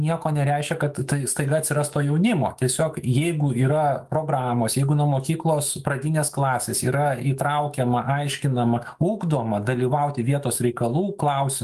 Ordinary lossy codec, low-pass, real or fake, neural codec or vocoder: Opus, 32 kbps; 14.4 kHz; fake; autoencoder, 48 kHz, 128 numbers a frame, DAC-VAE, trained on Japanese speech